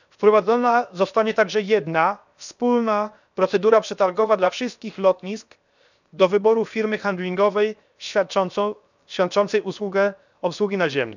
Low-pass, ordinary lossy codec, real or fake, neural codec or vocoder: 7.2 kHz; none; fake; codec, 16 kHz, 0.7 kbps, FocalCodec